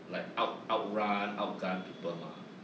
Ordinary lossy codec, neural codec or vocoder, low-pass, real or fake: none; none; none; real